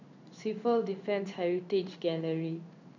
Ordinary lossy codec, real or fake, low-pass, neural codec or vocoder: none; fake; 7.2 kHz; codec, 16 kHz in and 24 kHz out, 1 kbps, XY-Tokenizer